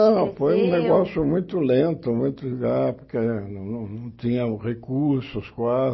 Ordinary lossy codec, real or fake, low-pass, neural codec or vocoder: MP3, 24 kbps; real; 7.2 kHz; none